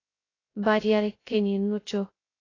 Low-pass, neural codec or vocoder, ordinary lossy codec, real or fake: 7.2 kHz; codec, 16 kHz, 0.2 kbps, FocalCodec; AAC, 32 kbps; fake